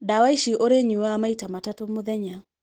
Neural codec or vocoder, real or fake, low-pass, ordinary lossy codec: none; real; 19.8 kHz; Opus, 16 kbps